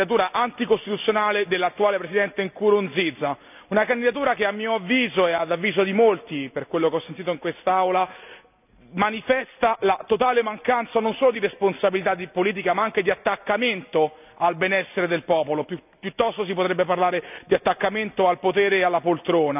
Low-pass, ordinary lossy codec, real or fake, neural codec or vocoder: 3.6 kHz; none; real; none